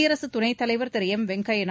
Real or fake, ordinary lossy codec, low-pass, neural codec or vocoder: real; none; none; none